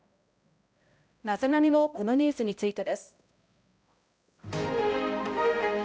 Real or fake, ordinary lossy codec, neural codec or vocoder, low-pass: fake; none; codec, 16 kHz, 0.5 kbps, X-Codec, HuBERT features, trained on balanced general audio; none